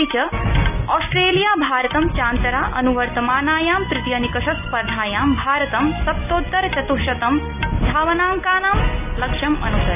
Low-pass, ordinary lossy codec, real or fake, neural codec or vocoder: 3.6 kHz; none; real; none